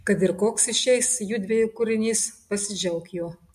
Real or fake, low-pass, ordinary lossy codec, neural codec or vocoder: real; 14.4 kHz; MP3, 64 kbps; none